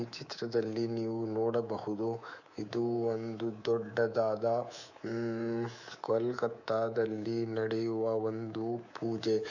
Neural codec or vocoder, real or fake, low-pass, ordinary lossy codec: codec, 24 kHz, 3.1 kbps, DualCodec; fake; 7.2 kHz; none